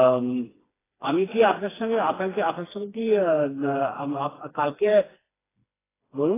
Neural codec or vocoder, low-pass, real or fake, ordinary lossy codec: codec, 16 kHz, 2 kbps, FreqCodec, smaller model; 3.6 kHz; fake; AAC, 16 kbps